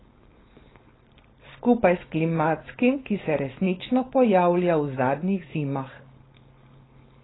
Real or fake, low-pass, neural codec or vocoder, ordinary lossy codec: fake; 7.2 kHz; codec, 16 kHz, 4.8 kbps, FACodec; AAC, 16 kbps